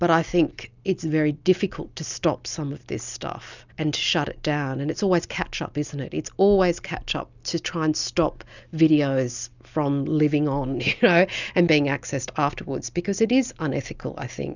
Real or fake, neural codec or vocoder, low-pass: real; none; 7.2 kHz